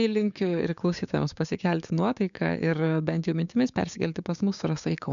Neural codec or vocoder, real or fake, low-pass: codec, 16 kHz, 6 kbps, DAC; fake; 7.2 kHz